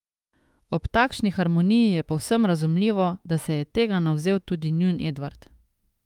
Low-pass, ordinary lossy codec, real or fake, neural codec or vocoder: 19.8 kHz; Opus, 32 kbps; fake; autoencoder, 48 kHz, 32 numbers a frame, DAC-VAE, trained on Japanese speech